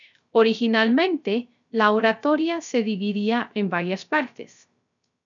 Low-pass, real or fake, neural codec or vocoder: 7.2 kHz; fake; codec, 16 kHz, 0.3 kbps, FocalCodec